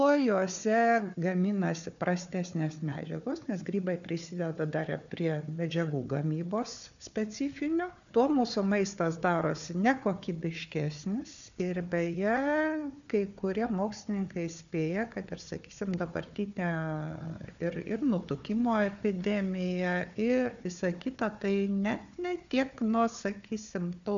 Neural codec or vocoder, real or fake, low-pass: codec, 16 kHz, 4 kbps, FunCodec, trained on Chinese and English, 50 frames a second; fake; 7.2 kHz